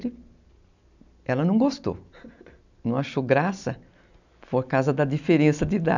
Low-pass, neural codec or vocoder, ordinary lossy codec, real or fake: 7.2 kHz; none; none; real